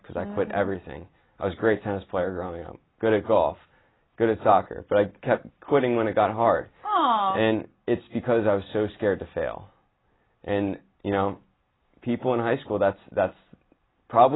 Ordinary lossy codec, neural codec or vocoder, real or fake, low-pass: AAC, 16 kbps; none; real; 7.2 kHz